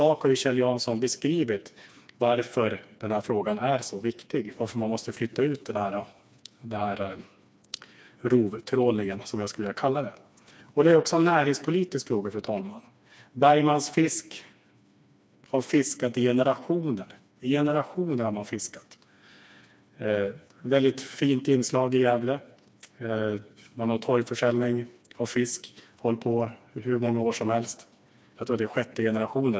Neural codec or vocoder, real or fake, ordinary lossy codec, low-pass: codec, 16 kHz, 2 kbps, FreqCodec, smaller model; fake; none; none